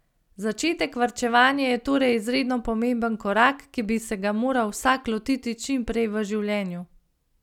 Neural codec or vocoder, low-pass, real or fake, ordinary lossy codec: none; 19.8 kHz; real; none